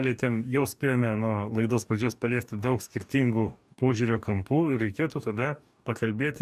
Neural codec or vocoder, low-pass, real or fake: codec, 44.1 kHz, 2.6 kbps, DAC; 14.4 kHz; fake